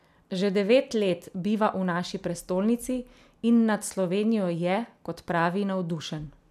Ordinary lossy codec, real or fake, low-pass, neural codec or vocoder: none; real; 14.4 kHz; none